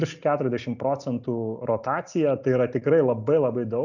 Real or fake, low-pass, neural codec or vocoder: real; 7.2 kHz; none